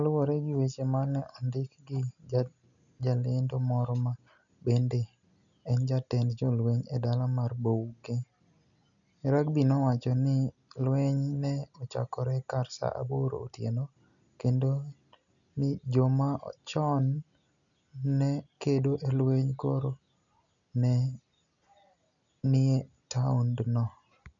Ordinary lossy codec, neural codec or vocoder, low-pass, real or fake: none; none; 7.2 kHz; real